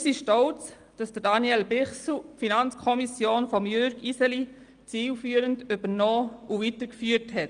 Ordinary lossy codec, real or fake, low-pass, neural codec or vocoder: Opus, 32 kbps; real; 9.9 kHz; none